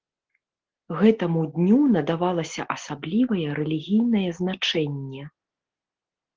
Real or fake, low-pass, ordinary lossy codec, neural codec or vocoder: real; 7.2 kHz; Opus, 16 kbps; none